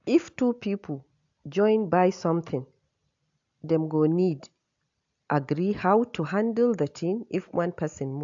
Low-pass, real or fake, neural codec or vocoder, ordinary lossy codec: 7.2 kHz; real; none; AAC, 64 kbps